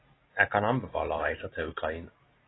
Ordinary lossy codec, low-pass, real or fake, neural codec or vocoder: AAC, 16 kbps; 7.2 kHz; real; none